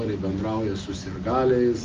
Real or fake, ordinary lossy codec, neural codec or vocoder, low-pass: real; Opus, 32 kbps; none; 7.2 kHz